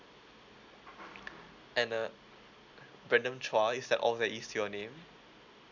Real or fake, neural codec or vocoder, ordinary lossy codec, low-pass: real; none; none; 7.2 kHz